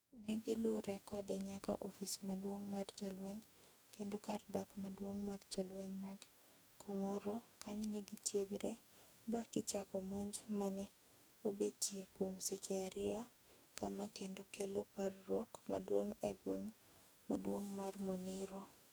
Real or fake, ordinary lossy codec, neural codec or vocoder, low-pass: fake; none; codec, 44.1 kHz, 2.6 kbps, DAC; none